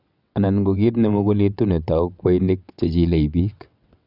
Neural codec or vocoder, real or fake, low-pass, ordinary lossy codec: vocoder, 22.05 kHz, 80 mel bands, WaveNeXt; fake; 5.4 kHz; none